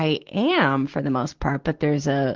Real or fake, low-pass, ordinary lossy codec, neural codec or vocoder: fake; 7.2 kHz; Opus, 16 kbps; vocoder, 44.1 kHz, 128 mel bands every 512 samples, BigVGAN v2